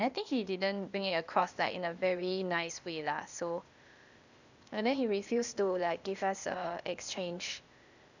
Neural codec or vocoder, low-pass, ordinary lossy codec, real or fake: codec, 16 kHz, 0.8 kbps, ZipCodec; 7.2 kHz; none; fake